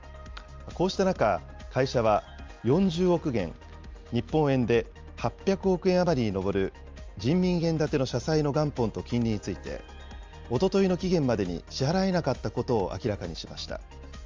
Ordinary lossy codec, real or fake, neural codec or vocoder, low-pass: Opus, 32 kbps; real; none; 7.2 kHz